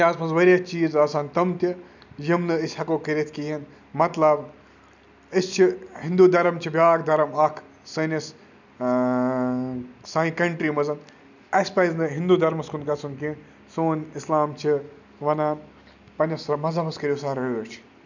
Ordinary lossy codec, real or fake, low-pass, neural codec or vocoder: none; real; 7.2 kHz; none